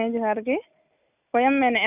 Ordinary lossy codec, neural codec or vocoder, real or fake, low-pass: none; none; real; 3.6 kHz